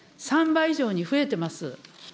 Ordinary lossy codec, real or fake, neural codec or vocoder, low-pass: none; real; none; none